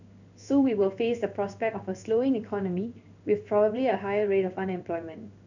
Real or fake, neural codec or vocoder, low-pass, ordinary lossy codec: fake; codec, 16 kHz in and 24 kHz out, 1 kbps, XY-Tokenizer; 7.2 kHz; none